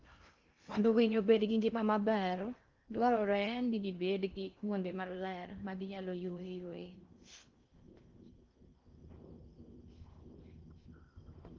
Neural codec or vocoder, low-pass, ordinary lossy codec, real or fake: codec, 16 kHz in and 24 kHz out, 0.6 kbps, FocalCodec, streaming, 4096 codes; 7.2 kHz; Opus, 24 kbps; fake